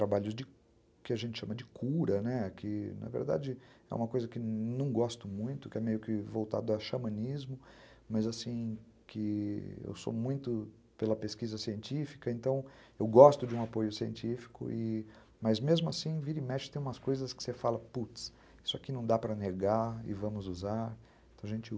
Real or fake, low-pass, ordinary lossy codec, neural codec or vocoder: real; none; none; none